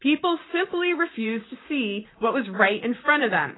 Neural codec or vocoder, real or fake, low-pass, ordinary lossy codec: vocoder, 44.1 kHz, 128 mel bands, Pupu-Vocoder; fake; 7.2 kHz; AAC, 16 kbps